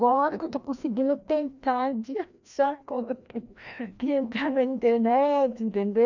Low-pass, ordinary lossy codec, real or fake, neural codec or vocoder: 7.2 kHz; none; fake; codec, 16 kHz, 1 kbps, FreqCodec, larger model